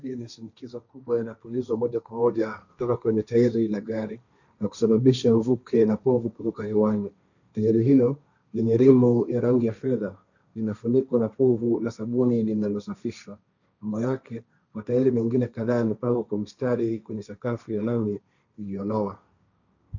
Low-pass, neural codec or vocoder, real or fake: 7.2 kHz; codec, 16 kHz, 1.1 kbps, Voila-Tokenizer; fake